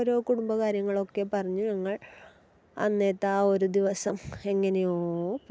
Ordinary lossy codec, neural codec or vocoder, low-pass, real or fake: none; none; none; real